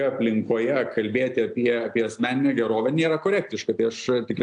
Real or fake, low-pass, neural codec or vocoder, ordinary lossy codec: real; 9.9 kHz; none; AAC, 64 kbps